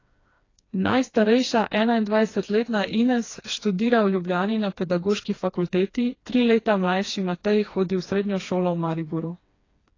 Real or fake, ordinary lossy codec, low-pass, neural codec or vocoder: fake; AAC, 32 kbps; 7.2 kHz; codec, 16 kHz, 2 kbps, FreqCodec, smaller model